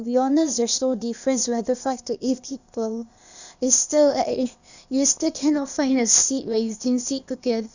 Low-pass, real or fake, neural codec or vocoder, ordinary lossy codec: 7.2 kHz; fake; codec, 16 kHz, 0.8 kbps, ZipCodec; none